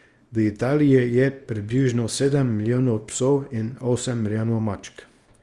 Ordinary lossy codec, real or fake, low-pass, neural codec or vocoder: Opus, 32 kbps; fake; 10.8 kHz; codec, 24 kHz, 0.9 kbps, WavTokenizer, small release